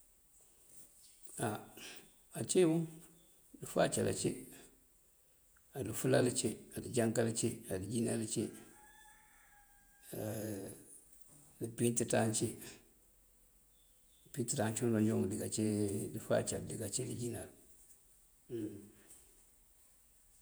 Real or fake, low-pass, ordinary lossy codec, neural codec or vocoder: fake; none; none; vocoder, 48 kHz, 128 mel bands, Vocos